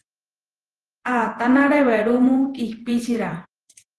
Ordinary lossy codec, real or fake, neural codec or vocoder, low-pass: Opus, 16 kbps; fake; vocoder, 48 kHz, 128 mel bands, Vocos; 10.8 kHz